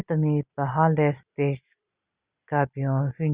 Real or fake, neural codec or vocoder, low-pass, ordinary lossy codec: real; none; 3.6 kHz; Opus, 64 kbps